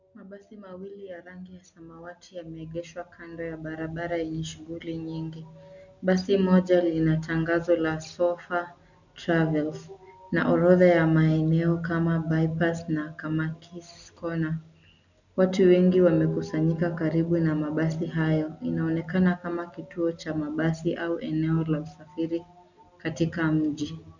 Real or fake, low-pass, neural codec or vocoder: real; 7.2 kHz; none